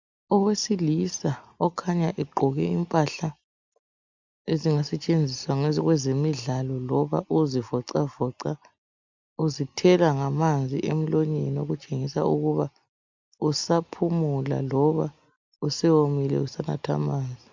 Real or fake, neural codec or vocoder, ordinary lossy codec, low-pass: real; none; MP3, 64 kbps; 7.2 kHz